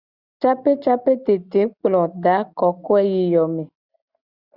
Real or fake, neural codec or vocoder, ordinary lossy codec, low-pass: real; none; Opus, 64 kbps; 5.4 kHz